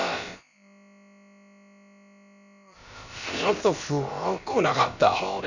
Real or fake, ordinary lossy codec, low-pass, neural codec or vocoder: fake; none; 7.2 kHz; codec, 16 kHz, about 1 kbps, DyCAST, with the encoder's durations